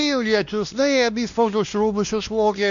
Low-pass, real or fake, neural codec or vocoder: 7.2 kHz; fake; codec, 16 kHz, 1 kbps, X-Codec, WavLM features, trained on Multilingual LibriSpeech